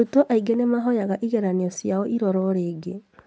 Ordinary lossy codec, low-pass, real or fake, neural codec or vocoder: none; none; real; none